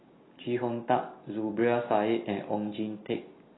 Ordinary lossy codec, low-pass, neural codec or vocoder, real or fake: AAC, 16 kbps; 7.2 kHz; none; real